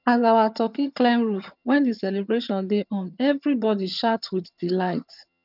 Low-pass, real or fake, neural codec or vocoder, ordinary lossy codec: 5.4 kHz; fake; vocoder, 22.05 kHz, 80 mel bands, HiFi-GAN; none